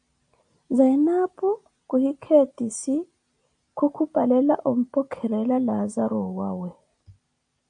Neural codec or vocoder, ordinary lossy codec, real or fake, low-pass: none; AAC, 64 kbps; real; 9.9 kHz